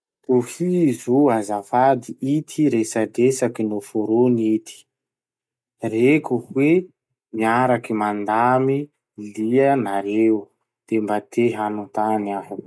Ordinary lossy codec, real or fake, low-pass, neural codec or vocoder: none; real; none; none